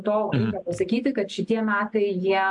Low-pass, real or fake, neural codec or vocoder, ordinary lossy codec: 10.8 kHz; real; none; MP3, 64 kbps